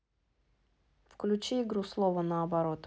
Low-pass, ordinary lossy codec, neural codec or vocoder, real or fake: none; none; none; real